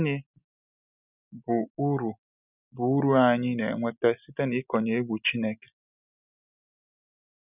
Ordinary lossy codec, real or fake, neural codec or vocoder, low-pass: none; real; none; 3.6 kHz